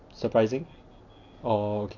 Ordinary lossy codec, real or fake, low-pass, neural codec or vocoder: none; real; 7.2 kHz; none